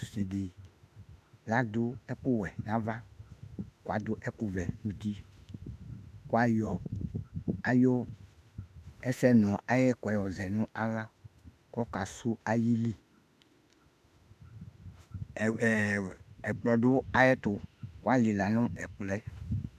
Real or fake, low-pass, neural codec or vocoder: fake; 14.4 kHz; autoencoder, 48 kHz, 32 numbers a frame, DAC-VAE, trained on Japanese speech